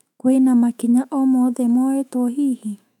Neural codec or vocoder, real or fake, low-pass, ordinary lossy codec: autoencoder, 48 kHz, 128 numbers a frame, DAC-VAE, trained on Japanese speech; fake; 19.8 kHz; none